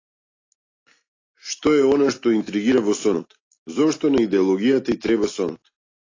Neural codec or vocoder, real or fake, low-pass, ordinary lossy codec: none; real; 7.2 kHz; AAC, 32 kbps